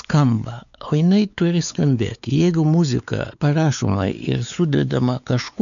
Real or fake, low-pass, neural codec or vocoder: fake; 7.2 kHz; codec, 16 kHz, 4 kbps, X-Codec, WavLM features, trained on Multilingual LibriSpeech